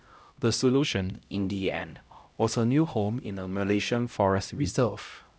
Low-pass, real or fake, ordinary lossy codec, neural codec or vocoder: none; fake; none; codec, 16 kHz, 0.5 kbps, X-Codec, HuBERT features, trained on LibriSpeech